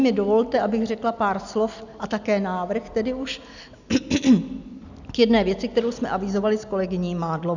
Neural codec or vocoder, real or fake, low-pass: none; real; 7.2 kHz